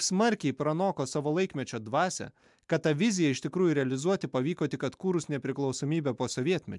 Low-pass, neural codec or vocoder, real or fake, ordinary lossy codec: 10.8 kHz; none; real; MP3, 96 kbps